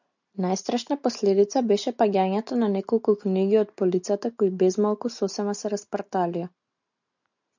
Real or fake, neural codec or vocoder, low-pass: real; none; 7.2 kHz